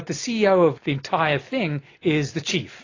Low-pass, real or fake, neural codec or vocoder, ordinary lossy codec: 7.2 kHz; real; none; AAC, 32 kbps